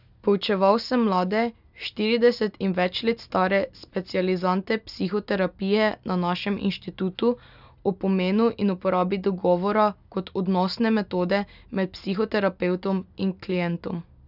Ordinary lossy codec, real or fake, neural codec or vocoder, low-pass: none; real; none; 5.4 kHz